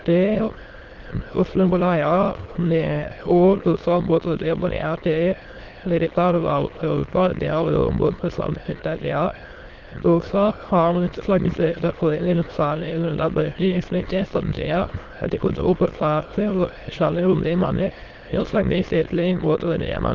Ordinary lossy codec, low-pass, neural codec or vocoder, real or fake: Opus, 16 kbps; 7.2 kHz; autoencoder, 22.05 kHz, a latent of 192 numbers a frame, VITS, trained on many speakers; fake